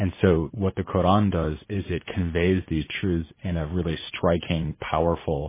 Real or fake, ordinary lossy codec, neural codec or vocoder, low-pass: fake; MP3, 16 kbps; vocoder, 44.1 kHz, 80 mel bands, Vocos; 3.6 kHz